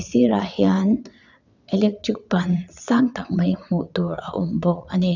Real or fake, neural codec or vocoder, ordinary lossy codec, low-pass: fake; vocoder, 22.05 kHz, 80 mel bands, WaveNeXt; none; 7.2 kHz